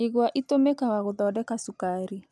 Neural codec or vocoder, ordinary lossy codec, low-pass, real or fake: none; none; none; real